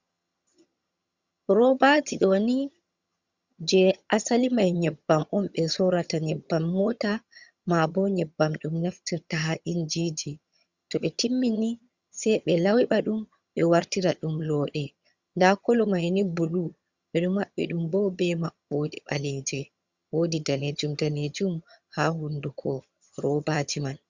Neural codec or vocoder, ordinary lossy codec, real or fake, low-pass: vocoder, 22.05 kHz, 80 mel bands, HiFi-GAN; Opus, 64 kbps; fake; 7.2 kHz